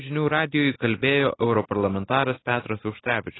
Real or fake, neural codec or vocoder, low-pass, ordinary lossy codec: real; none; 7.2 kHz; AAC, 16 kbps